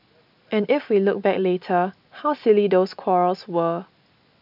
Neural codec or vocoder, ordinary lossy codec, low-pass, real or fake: none; none; 5.4 kHz; real